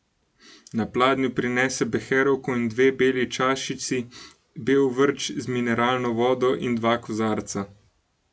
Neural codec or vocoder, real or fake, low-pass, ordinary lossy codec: none; real; none; none